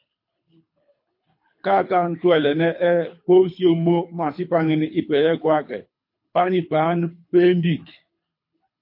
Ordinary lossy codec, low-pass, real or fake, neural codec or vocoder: MP3, 32 kbps; 5.4 kHz; fake; codec, 24 kHz, 3 kbps, HILCodec